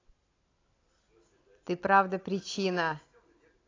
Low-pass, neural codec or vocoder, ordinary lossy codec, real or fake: 7.2 kHz; none; AAC, 32 kbps; real